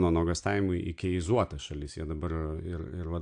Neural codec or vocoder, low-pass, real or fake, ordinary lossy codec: none; 9.9 kHz; real; AAC, 64 kbps